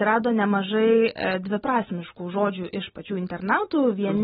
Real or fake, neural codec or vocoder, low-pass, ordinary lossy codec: real; none; 7.2 kHz; AAC, 16 kbps